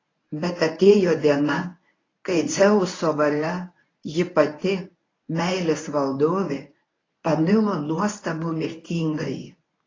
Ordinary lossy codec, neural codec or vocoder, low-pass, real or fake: AAC, 32 kbps; codec, 24 kHz, 0.9 kbps, WavTokenizer, medium speech release version 1; 7.2 kHz; fake